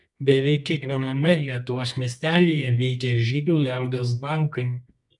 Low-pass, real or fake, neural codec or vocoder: 10.8 kHz; fake; codec, 24 kHz, 0.9 kbps, WavTokenizer, medium music audio release